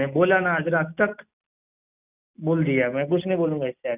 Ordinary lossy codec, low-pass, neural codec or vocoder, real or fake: none; 3.6 kHz; none; real